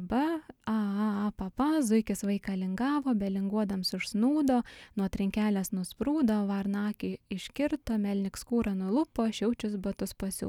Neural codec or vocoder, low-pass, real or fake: none; 19.8 kHz; real